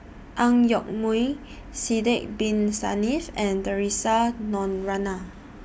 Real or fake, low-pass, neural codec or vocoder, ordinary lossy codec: real; none; none; none